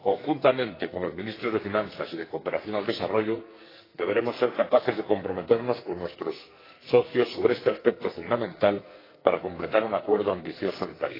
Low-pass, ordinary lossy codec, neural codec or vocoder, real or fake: 5.4 kHz; AAC, 24 kbps; codec, 44.1 kHz, 2.6 kbps, SNAC; fake